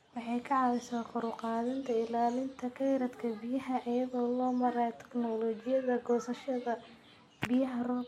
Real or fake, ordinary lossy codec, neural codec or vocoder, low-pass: real; MP3, 64 kbps; none; 19.8 kHz